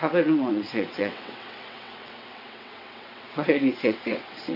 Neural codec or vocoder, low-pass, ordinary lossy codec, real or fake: vocoder, 22.05 kHz, 80 mel bands, WaveNeXt; 5.4 kHz; none; fake